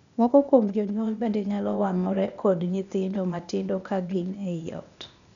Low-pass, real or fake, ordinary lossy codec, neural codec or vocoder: 7.2 kHz; fake; none; codec, 16 kHz, 0.8 kbps, ZipCodec